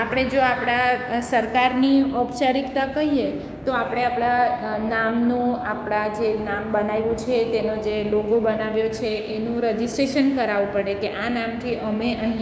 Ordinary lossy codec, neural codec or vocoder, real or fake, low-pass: none; codec, 16 kHz, 6 kbps, DAC; fake; none